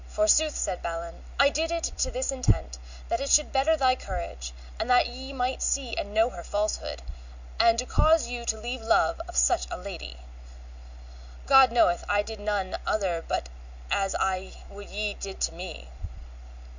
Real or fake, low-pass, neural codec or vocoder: real; 7.2 kHz; none